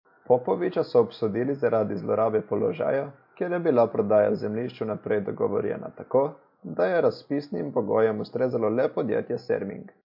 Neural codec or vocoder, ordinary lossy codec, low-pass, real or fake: none; MP3, 32 kbps; 5.4 kHz; real